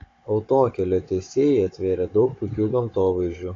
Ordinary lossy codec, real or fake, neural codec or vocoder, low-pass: AAC, 32 kbps; fake; codec, 16 kHz, 16 kbps, FunCodec, trained on Chinese and English, 50 frames a second; 7.2 kHz